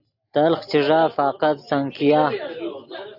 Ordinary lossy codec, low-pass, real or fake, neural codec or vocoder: AAC, 48 kbps; 5.4 kHz; real; none